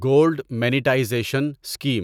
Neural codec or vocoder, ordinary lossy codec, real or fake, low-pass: none; none; real; 19.8 kHz